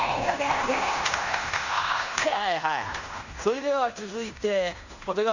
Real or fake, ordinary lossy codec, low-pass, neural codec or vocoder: fake; none; 7.2 kHz; codec, 16 kHz in and 24 kHz out, 0.9 kbps, LongCat-Audio-Codec, fine tuned four codebook decoder